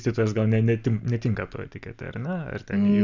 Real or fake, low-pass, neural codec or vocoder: real; 7.2 kHz; none